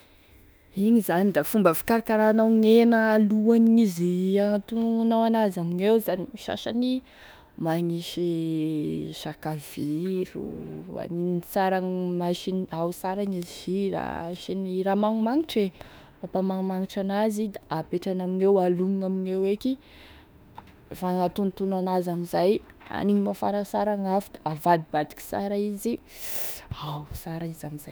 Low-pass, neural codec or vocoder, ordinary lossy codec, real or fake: none; autoencoder, 48 kHz, 32 numbers a frame, DAC-VAE, trained on Japanese speech; none; fake